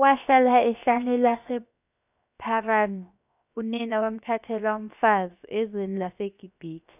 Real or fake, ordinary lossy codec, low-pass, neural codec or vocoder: fake; none; 3.6 kHz; codec, 16 kHz, 0.7 kbps, FocalCodec